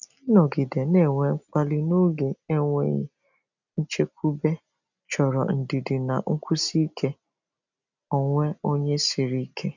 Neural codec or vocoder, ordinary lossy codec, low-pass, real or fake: none; none; 7.2 kHz; real